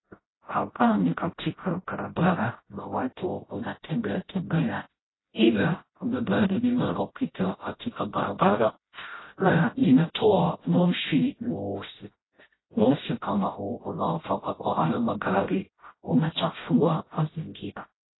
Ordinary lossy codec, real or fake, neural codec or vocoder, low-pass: AAC, 16 kbps; fake; codec, 16 kHz, 0.5 kbps, FreqCodec, smaller model; 7.2 kHz